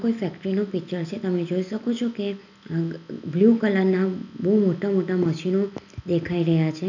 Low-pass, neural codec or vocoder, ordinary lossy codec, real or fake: 7.2 kHz; none; none; real